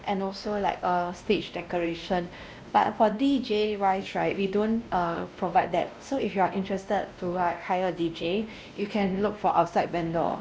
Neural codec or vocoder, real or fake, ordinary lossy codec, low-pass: codec, 16 kHz, 1 kbps, X-Codec, WavLM features, trained on Multilingual LibriSpeech; fake; none; none